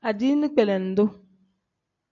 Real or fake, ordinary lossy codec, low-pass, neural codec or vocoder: real; MP3, 48 kbps; 7.2 kHz; none